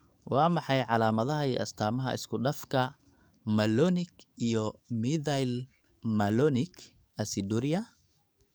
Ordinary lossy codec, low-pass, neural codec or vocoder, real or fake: none; none; codec, 44.1 kHz, 7.8 kbps, DAC; fake